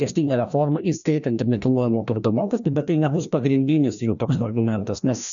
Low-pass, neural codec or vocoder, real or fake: 7.2 kHz; codec, 16 kHz, 1 kbps, FreqCodec, larger model; fake